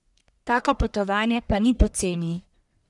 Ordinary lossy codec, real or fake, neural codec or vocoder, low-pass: none; fake; codec, 44.1 kHz, 1.7 kbps, Pupu-Codec; 10.8 kHz